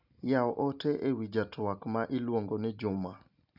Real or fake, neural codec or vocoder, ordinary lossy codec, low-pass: real; none; none; 5.4 kHz